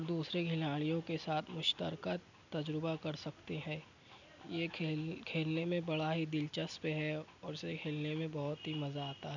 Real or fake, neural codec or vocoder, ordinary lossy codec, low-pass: real; none; none; 7.2 kHz